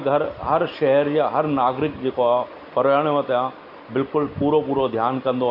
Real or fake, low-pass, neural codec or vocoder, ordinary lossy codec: real; 5.4 kHz; none; none